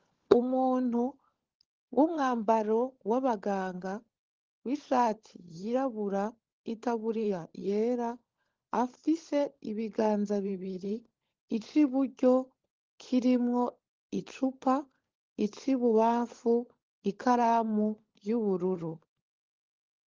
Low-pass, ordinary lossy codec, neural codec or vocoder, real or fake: 7.2 kHz; Opus, 16 kbps; codec, 16 kHz, 16 kbps, FunCodec, trained on LibriTTS, 50 frames a second; fake